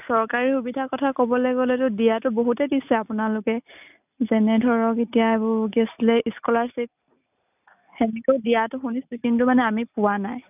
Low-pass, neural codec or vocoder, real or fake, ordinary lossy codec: 3.6 kHz; none; real; none